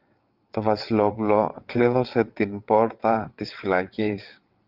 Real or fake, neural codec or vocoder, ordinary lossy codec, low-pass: fake; vocoder, 22.05 kHz, 80 mel bands, WaveNeXt; Opus, 32 kbps; 5.4 kHz